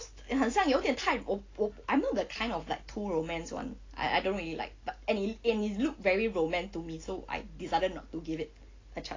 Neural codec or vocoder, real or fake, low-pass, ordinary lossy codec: none; real; 7.2 kHz; AAC, 32 kbps